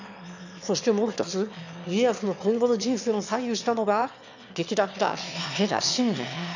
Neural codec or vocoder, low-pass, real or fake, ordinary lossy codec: autoencoder, 22.05 kHz, a latent of 192 numbers a frame, VITS, trained on one speaker; 7.2 kHz; fake; none